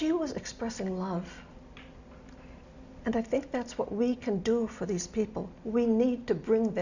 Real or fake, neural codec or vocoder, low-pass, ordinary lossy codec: real; none; 7.2 kHz; Opus, 64 kbps